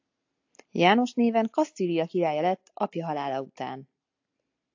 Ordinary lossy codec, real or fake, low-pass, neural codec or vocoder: AAC, 48 kbps; real; 7.2 kHz; none